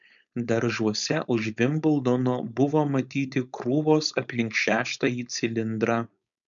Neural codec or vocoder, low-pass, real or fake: codec, 16 kHz, 4.8 kbps, FACodec; 7.2 kHz; fake